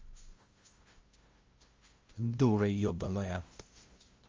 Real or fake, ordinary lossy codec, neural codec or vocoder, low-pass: fake; Opus, 32 kbps; codec, 16 kHz, 0.5 kbps, FunCodec, trained on LibriTTS, 25 frames a second; 7.2 kHz